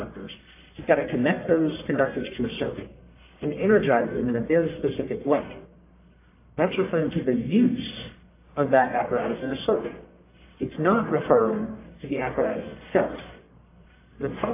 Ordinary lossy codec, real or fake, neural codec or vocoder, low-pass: AAC, 24 kbps; fake; codec, 44.1 kHz, 1.7 kbps, Pupu-Codec; 3.6 kHz